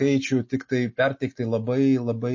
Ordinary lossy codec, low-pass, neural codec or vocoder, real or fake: MP3, 32 kbps; 7.2 kHz; none; real